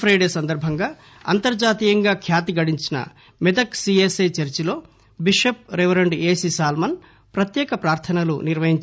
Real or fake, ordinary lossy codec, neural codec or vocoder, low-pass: real; none; none; none